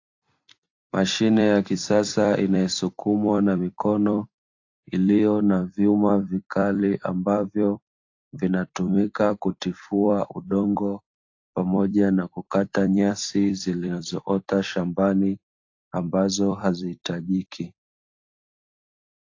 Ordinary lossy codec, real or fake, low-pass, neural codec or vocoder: AAC, 48 kbps; fake; 7.2 kHz; vocoder, 44.1 kHz, 128 mel bands every 256 samples, BigVGAN v2